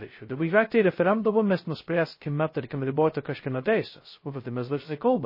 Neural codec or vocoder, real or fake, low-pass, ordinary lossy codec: codec, 16 kHz, 0.2 kbps, FocalCodec; fake; 5.4 kHz; MP3, 24 kbps